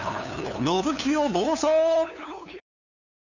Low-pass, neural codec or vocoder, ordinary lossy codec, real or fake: 7.2 kHz; codec, 16 kHz, 2 kbps, FunCodec, trained on LibriTTS, 25 frames a second; none; fake